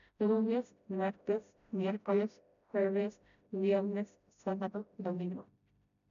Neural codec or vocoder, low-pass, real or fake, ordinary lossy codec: codec, 16 kHz, 0.5 kbps, FreqCodec, smaller model; 7.2 kHz; fake; none